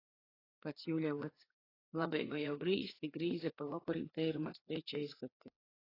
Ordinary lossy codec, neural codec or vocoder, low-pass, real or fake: AAC, 24 kbps; codec, 16 kHz, 4 kbps, FreqCodec, larger model; 5.4 kHz; fake